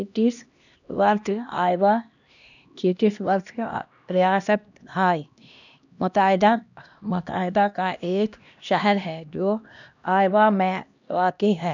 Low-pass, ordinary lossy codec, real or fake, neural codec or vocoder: 7.2 kHz; none; fake; codec, 16 kHz, 1 kbps, X-Codec, HuBERT features, trained on LibriSpeech